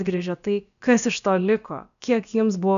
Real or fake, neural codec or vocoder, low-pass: fake; codec, 16 kHz, about 1 kbps, DyCAST, with the encoder's durations; 7.2 kHz